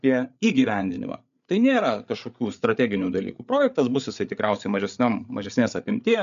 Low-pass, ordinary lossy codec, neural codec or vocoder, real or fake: 7.2 kHz; MP3, 96 kbps; codec, 16 kHz, 8 kbps, FreqCodec, larger model; fake